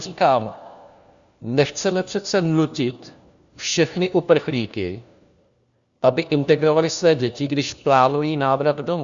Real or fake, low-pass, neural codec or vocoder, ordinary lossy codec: fake; 7.2 kHz; codec, 16 kHz, 1 kbps, FunCodec, trained on LibriTTS, 50 frames a second; Opus, 64 kbps